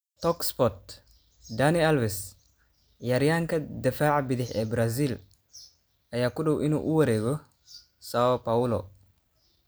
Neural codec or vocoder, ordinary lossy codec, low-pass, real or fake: none; none; none; real